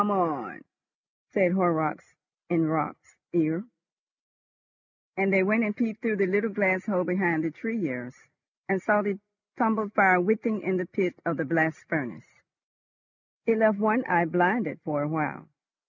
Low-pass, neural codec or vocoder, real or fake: 7.2 kHz; none; real